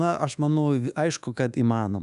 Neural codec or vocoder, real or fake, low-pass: codec, 24 kHz, 1.2 kbps, DualCodec; fake; 10.8 kHz